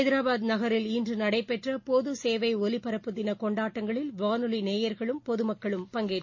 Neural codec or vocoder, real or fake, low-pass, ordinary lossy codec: none; real; 7.2 kHz; MP3, 32 kbps